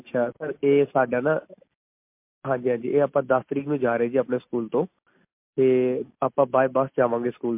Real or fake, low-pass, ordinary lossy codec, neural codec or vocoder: real; 3.6 kHz; MP3, 32 kbps; none